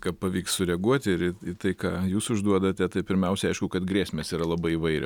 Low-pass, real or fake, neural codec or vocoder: 19.8 kHz; real; none